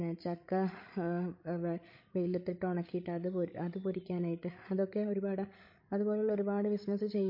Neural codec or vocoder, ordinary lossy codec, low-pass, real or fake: codec, 16 kHz, 16 kbps, FreqCodec, larger model; MP3, 32 kbps; 5.4 kHz; fake